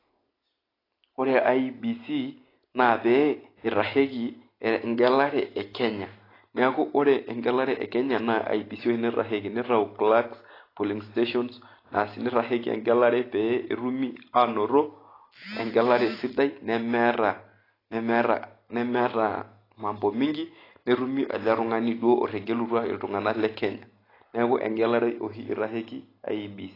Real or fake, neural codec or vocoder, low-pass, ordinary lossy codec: real; none; 5.4 kHz; AAC, 24 kbps